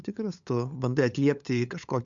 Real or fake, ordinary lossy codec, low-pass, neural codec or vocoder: fake; MP3, 64 kbps; 7.2 kHz; codec, 16 kHz, 8 kbps, FunCodec, trained on LibriTTS, 25 frames a second